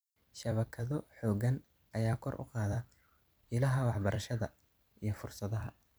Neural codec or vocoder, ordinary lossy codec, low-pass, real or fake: vocoder, 44.1 kHz, 128 mel bands every 256 samples, BigVGAN v2; none; none; fake